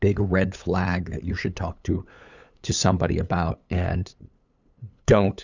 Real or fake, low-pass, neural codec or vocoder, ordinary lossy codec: fake; 7.2 kHz; codec, 16 kHz, 8 kbps, FunCodec, trained on LibriTTS, 25 frames a second; Opus, 64 kbps